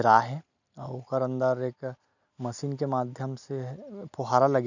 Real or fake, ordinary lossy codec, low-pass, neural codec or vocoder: real; none; 7.2 kHz; none